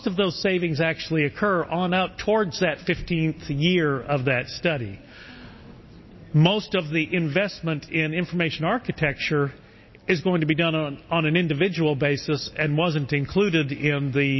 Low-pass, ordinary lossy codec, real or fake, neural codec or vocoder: 7.2 kHz; MP3, 24 kbps; real; none